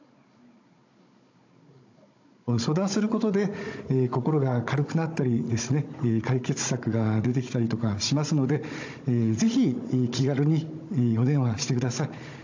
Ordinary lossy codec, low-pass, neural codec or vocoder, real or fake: AAC, 48 kbps; 7.2 kHz; codec, 16 kHz, 16 kbps, FunCodec, trained on Chinese and English, 50 frames a second; fake